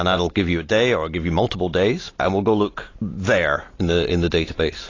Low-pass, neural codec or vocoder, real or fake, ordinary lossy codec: 7.2 kHz; none; real; AAC, 32 kbps